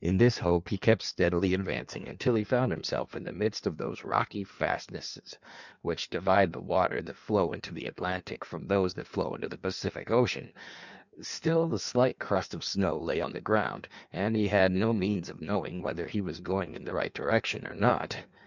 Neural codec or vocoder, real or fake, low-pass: codec, 16 kHz in and 24 kHz out, 1.1 kbps, FireRedTTS-2 codec; fake; 7.2 kHz